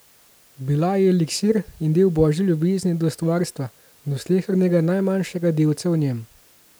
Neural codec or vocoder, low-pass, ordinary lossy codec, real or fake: none; none; none; real